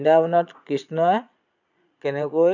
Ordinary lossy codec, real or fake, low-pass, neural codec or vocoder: none; fake; 7.2 kHz; vocoder, 22.05 kHz, 80 mel bands, Vocos